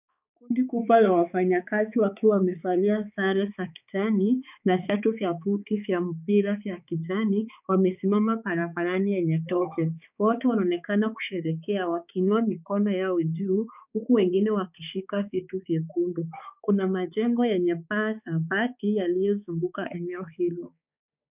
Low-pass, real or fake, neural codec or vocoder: 3.6 kHz; fake; codec, 16 kHz, 4 kbps, X-Codec, HuBERT features, trained on balanced general audio